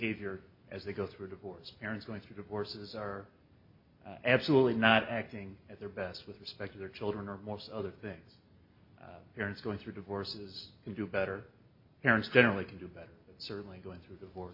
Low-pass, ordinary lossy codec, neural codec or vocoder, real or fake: 5.4 kHz; MP3, 32 kbps; none; real